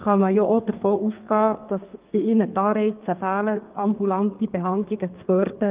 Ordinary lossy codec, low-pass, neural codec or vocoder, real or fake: Opus, 24 kbps; 3.6 kHz; codec, 32 kHz, 1.9 kbps, SNAC; fake